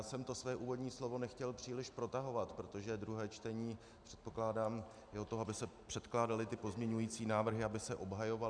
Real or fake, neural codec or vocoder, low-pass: real; none; 9.9 kHz